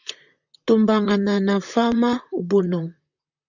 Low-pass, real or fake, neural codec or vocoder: 7.2 kHz; fake; vocoder, 44.1 kHz, 128 mel bands, Pupu-Vocoder